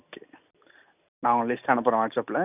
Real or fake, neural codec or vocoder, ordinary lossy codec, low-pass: real; none; none; 3.6 kHz